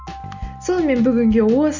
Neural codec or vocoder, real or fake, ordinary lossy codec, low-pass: none; real; Opus, 64 kbps; 7.2 kHz